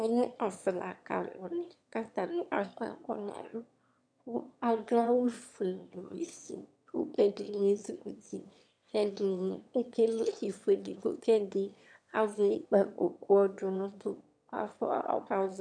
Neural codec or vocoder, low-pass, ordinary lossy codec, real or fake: autoencoder, 22.05 kHz, a latent of 192 numbers a frame, VITS, trained on one speaker; 9.9 kHz; MP3, 64 kbps; fake